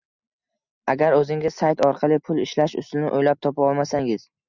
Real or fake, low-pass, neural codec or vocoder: real; 7.2 kHz; none